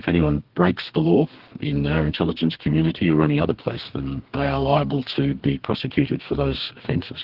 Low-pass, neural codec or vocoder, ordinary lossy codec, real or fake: 5.4 kHz; codec, 32 kHz, 1.9 kbps, SNAC; Opus, 16 kbps; fake